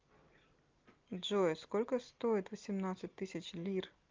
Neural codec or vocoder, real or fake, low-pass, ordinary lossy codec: none; real; 7.2 kHz; Opus, 24 kbps